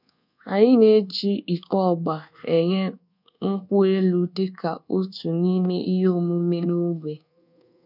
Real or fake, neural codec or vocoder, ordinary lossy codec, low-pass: fake; codec, 24 kHz, 1.2 kbps, DualCodec; none; 5.4 kHz